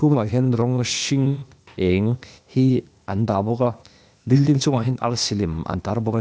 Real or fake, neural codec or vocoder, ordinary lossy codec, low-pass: fake; codec, 16 kHz, 0.8 kbps, ZipCodec; none; none